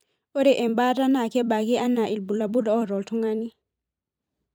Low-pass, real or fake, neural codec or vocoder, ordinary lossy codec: none; fake; vocoder, 44.1 kHz, 128 mel bands every 256 samples, BigVGAN v2; none